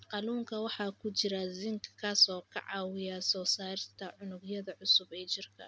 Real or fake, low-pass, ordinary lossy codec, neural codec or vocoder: real; none; none; none